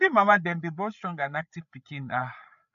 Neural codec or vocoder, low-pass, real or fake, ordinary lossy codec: codec, 16 kHz, 8 kbps, FreqCodec, larger model; 7.2 kHz; fake; none